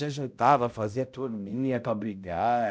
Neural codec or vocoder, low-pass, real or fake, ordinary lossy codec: codec, 16 kHz, 0.5 kbps, X-Codec, HuBERT features, trained on balanced general audio; none; fake; none